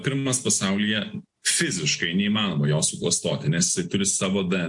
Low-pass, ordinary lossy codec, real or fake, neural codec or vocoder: 10.8 kHz; MP3, 64 kbps; real; none